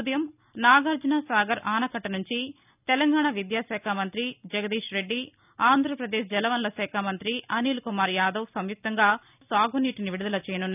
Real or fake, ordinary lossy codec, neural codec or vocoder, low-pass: real; none; none; 3.6 kHz